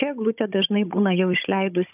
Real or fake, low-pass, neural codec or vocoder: fake; 3.6 kHz; codec, 16 kHz, 16 kbps, FunCodec, trained on Chinese and English, 50 frames a second